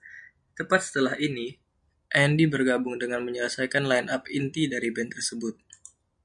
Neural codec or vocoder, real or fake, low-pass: none; real; 9.9 kHz